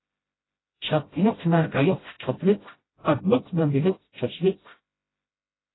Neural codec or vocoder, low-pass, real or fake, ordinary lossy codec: codec, 16 kHz, 0.5 kbps, FreqCodec, smaller model; 7.2 kHz; fake; AAC, 16 kbps